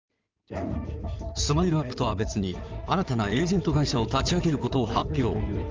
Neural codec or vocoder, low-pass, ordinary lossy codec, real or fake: codec, 16 kHz in and 24 kHz out, 2.2 kbps, FireRedTTS-2 codec; 7.2 kHz; Opus, 24 kbps; fake